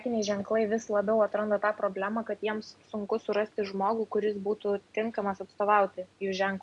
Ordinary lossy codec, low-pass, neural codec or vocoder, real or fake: MP3, 96 kbps; 10.8 kHz; none; real